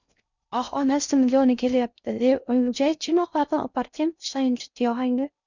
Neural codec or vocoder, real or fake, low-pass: codec, 16 kHz in and 24 kHz out, 0.6 kbps, FocalCodec, streaming, 2048 codes; fake; 7.2 kHz